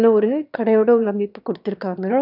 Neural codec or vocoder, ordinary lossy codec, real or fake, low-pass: autoencoder, 22.05 kHz, a latent of 192 numbers a frame, VITS, trained on one speaker; none; fake; 5.4 kHz